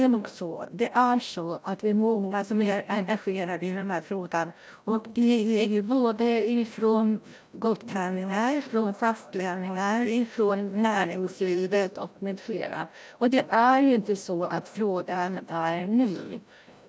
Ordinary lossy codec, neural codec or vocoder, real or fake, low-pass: none; codec, 16 kHz, 0.5 kbps, FreqCodec, larger model; fake; none